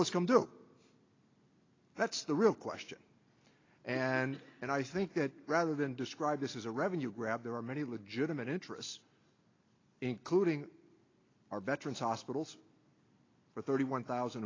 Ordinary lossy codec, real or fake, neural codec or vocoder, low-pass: AAC, 32 kbps; real; none; 7.2 kHz